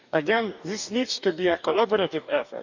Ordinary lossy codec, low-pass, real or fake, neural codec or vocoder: none; 7.2 kHz; fake; codec, 44.1 kHz, 2.6 kbps, DAC